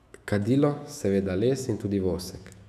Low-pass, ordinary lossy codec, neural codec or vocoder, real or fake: 14.4 kHz; none; autoencoder, 48 kHz, 128 numbers a frame, DAC-VAE, trained on Japanese speech; fake